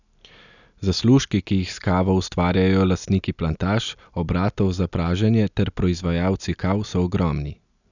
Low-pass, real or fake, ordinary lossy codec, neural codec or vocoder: 7.2 kHz; real; none; none